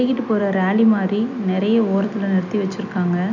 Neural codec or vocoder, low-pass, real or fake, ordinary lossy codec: none; 7.2 kHz; real; none